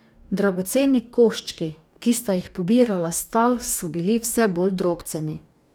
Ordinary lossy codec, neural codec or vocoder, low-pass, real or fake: none; codec, 44.1 kHz, 2.6 kbps, DAC; none; fake